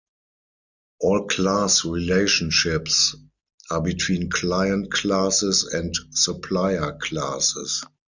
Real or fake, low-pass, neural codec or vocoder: real; 7.2 kHz; none